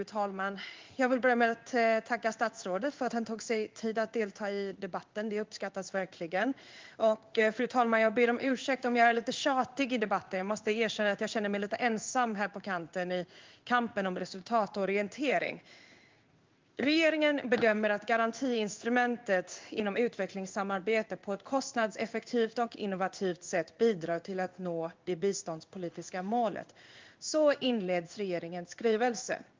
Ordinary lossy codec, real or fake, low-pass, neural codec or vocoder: Opus, 24 kbps; fake; 7.2 kHz; codec, 16 kHz in and 24 kHz out, 1 kbps, XY-Tokenizer